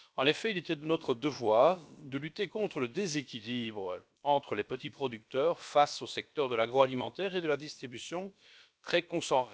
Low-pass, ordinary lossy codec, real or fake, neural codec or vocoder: none; none; fake; codec, 16 kHz, about 1 kbps, DyCAST, with the encoder's durations